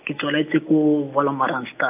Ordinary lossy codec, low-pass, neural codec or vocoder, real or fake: AAC, 32 kbps; 3.6 kHz; none; real